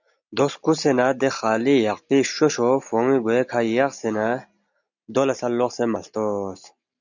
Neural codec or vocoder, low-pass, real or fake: none; 7.2 kHz; real